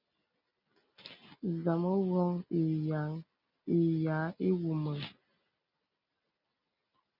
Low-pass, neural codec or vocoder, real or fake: 5.4 kHz; none; real